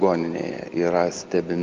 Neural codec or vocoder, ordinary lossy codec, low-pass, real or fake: none; Opus, 24 kbps; 7.2 kHz; real